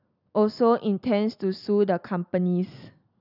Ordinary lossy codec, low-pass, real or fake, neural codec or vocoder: none; 5.4 kHz; real; none